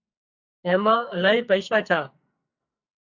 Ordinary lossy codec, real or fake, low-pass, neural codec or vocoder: Opus, 64 kbps; fake; 7.2 kHz; codec, 44.1 kHz, 2.6 kbps, SNAC